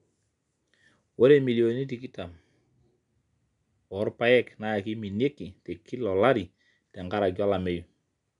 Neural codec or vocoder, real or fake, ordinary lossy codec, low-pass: none; real; MP3, 96 kbps; 10.8 kHz